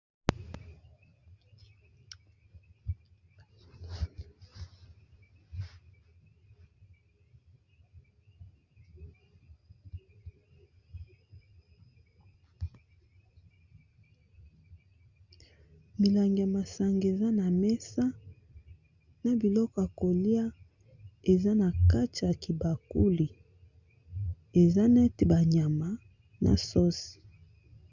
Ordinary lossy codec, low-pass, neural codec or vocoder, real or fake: Opus, 64 kbps; 7.2 kHz; none; real